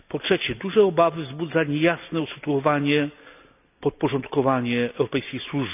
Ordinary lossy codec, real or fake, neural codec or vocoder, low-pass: none; real; none; 3.6 kHz